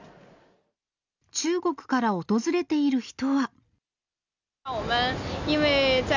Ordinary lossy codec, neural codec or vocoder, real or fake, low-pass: none; none; real; 7.2 kHz